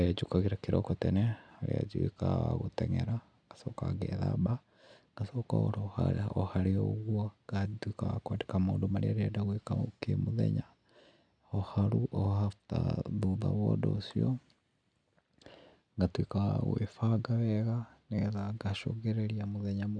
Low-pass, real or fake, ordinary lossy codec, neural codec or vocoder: 9.9 kHz; real; none; none